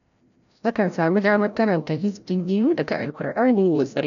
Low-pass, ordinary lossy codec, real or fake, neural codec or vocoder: 7.2 kHz; none; fake; codec, 16 kHz, 0.5 kbps, FreqCodec, larger model